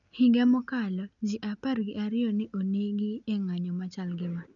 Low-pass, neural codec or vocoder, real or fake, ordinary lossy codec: 7.2 kHz; none; real; none